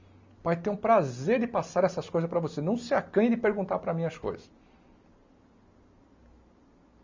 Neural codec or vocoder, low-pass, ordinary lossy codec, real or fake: none; 7.2 kHz; MP3, 64 kbps; real